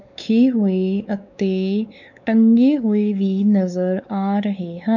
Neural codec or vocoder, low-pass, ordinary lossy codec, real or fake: codec, 16 kHz, 4 kbps, X-Codec, WavLM features, trained on Multilingual LibriSpeech; 7.2 kHz; none; fake